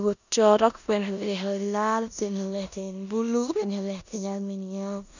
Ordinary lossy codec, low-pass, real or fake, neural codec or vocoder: none; 7.2 kHz; fake; codec, 16 kHz in and 24 kHz out, 0.9 kbps, LongCat-Audio-Codec, four codebook decoder